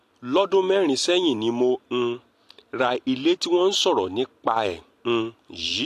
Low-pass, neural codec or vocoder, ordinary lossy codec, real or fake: 14.4 kHz; none; AAC, 64 kbps; real